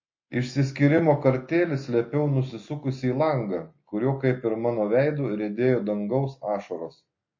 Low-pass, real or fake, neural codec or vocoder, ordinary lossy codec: 7.2 kHz; real; none; MP3, 32 kbps